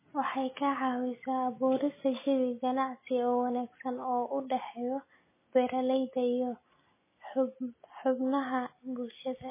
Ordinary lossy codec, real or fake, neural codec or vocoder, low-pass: MP3, 16 kbps; real; none; 3.6 kHz